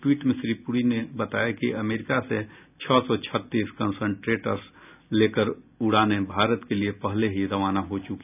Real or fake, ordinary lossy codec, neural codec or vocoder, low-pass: real; none; none; 3.6 kHz